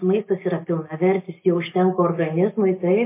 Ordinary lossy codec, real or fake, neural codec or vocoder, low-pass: MP3, 24 kbps; real; none; 3.6 kHz